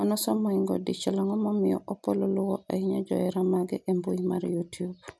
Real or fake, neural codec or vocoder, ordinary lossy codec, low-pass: real; none; none; none